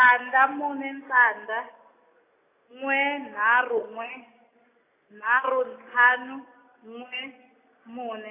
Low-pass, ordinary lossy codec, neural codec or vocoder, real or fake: 3.6 kHz; AAC, 24 kbps; none; real